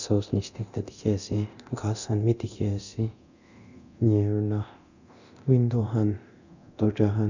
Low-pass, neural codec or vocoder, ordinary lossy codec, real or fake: 7.2 kHz; codec, 24 kHz, 0.9 kbps, DualCodec; none; fake